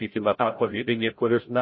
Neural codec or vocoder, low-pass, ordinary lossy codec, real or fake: codec, 16 kHz, 0.5 kbps, FreqCodec, larger model; 7.2 kHz; MP3, 24 kbps; fake